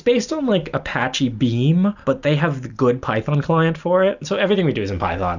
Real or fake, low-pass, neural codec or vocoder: real; 7.2 kHz; none